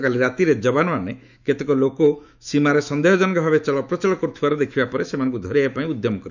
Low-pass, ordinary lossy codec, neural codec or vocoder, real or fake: 7.2 kHz; none; autoencoder, 48 kHz, 128 numbers a frame, DAC-VAE, trained on Japanese speech; fake